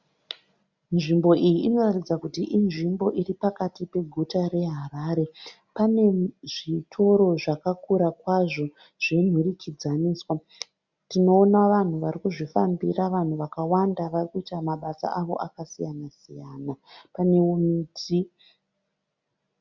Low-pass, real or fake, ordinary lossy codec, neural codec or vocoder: 7.2 kHz; real; Opus, 64 kbps; none